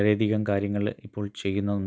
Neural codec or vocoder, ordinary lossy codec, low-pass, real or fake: none; none; none; real